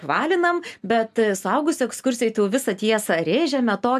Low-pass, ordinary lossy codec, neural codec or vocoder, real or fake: 14.4 kHz; AAC, 96 kbps; none; real